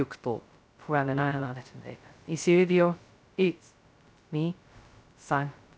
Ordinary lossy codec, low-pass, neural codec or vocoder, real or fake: none; none; codec, 16 kHz, 0.2 kbps, FocalCodec; fake